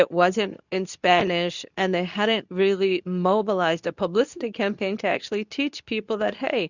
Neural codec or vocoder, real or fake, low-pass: codec, 24 kHz, 0.9 kbps, WavTokenizer, medium speech release version 2; fake; 7.2 kHz